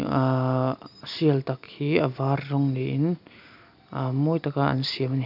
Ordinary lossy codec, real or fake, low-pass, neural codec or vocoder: none; real; 5.4 kHz; none